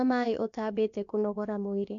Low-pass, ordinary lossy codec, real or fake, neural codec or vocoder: 7.2 kHz; MP3, 64 kbps; fake; codec, 16 kHz, about 1 kbps, DyCAST, with the encoder's durations